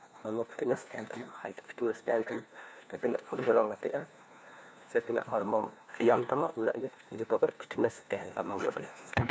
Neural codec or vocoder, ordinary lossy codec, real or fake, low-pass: codec, 16 kHz, 1 kbps, FunCodec, trained on LibriTTS, 50 frames a second; none; fake; none